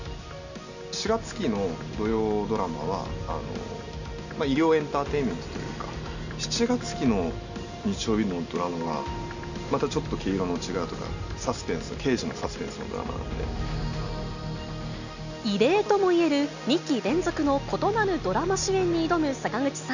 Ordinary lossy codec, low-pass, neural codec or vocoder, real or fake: none; 7.2 kHz; none; real